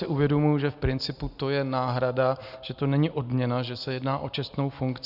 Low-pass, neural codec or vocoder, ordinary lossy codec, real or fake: 5.4 kHz; none; Opus, 64 kbps; real